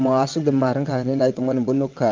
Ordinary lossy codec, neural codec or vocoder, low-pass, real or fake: Opus, 32 kbps; vocoder, 22.05 kHz, 80 mel bands, WaveNeXt; 7.2 kHz; fake